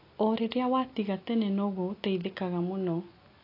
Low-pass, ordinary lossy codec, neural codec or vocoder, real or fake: 5.4 kHz; AAC, 32 kbps; none; real